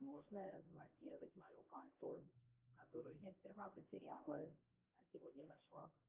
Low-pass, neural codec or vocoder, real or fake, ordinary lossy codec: 3.6 kHz; codec, 16 kHz, 1 kbps, X-Codec, HuBERT features, trained on LibriSpeech; fake; Opus, 32 kbps